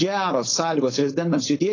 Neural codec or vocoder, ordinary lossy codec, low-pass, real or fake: codec, 16 kHz, 4.8 kbps, FACodec; AAC, 32 kbps; 7.2 kHz; fake